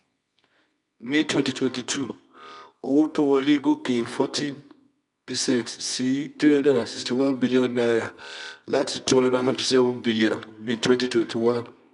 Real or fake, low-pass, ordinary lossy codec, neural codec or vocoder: fake; 10.8 kHz; none; codec, 24 kHz, 0.9 kbps, WavTokenizer, medium music audio release